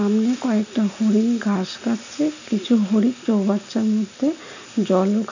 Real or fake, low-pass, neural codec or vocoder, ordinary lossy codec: real; 7.2 kHz; none; none